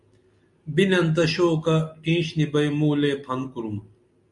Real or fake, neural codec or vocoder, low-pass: real; none; 10.8 kHz